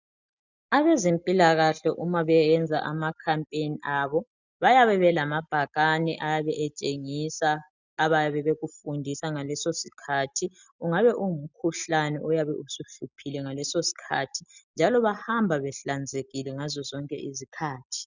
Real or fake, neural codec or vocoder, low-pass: real; none; 7.2 kHz